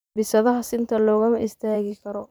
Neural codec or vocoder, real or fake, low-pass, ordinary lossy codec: vocoder, 44.1 kHz, 128 mel bands every 512 samples, BigVGAN v2; fake; none; none